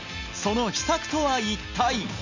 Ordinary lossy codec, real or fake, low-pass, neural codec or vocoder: none; real; 7.2 kHz; none